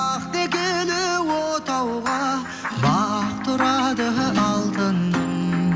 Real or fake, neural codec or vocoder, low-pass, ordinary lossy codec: real; none; none; none